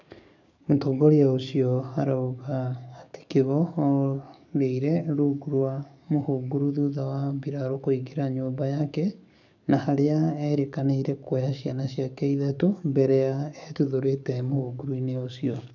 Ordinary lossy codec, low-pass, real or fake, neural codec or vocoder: none; 7.2 kHz; fake; codec, 44.1 kHz, 7.8 kbps, DAC